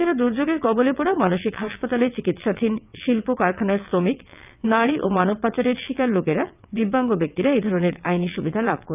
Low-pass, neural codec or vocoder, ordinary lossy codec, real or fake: 3.6 kHz; vocoder, 22.05 kHz, 80 mel bands, WaveNeXt; none; fake